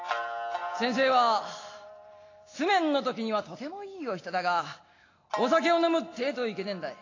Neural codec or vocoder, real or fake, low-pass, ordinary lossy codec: none; real; 7.2 kHz; AAC, 32 kbps